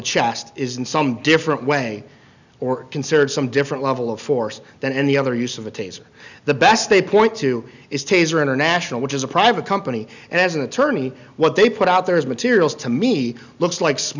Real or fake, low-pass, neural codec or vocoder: real; 7.2 kHz; none